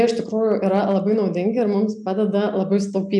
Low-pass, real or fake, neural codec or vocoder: 10.8 kHz; real; none